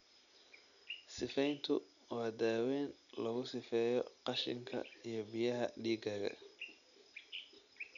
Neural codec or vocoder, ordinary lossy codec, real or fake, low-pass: none; none; real; 7.2 kHz